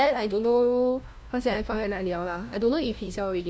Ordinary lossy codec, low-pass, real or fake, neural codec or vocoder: none; none; fake; codec, 16 kHz, 1 kbps, FunCodec, trained on LibriTTS, 50 frames a second